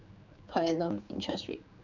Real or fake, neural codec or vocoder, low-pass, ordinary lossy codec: fake; codec, 16 kHz, 4 kbps, X-Codec, HuBERT features, trained on general audio; 7.2 kHz; none